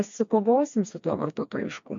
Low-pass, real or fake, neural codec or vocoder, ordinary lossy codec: 7.2 kHz; fake; codec, 16 kHz, 2 kbps, FreqCodec, smaller model; MP3, 48 kbps